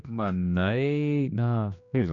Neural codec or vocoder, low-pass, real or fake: codec, 16 kHz, 2 kbps, X-Codec, HuBERT features, trained on general audio; 7.2 kHz; fake